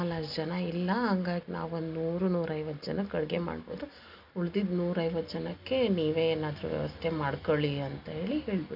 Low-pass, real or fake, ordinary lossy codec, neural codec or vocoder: 5.4 kHz; real; none; none